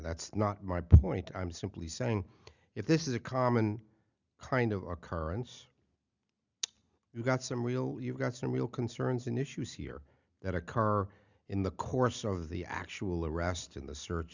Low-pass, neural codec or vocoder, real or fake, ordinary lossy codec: 7.2 kHz; none; real; Opus, 64 kbps